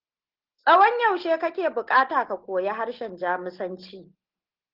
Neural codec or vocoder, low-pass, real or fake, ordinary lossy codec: none; 5.4 kHz; real; Opus, 16 kbps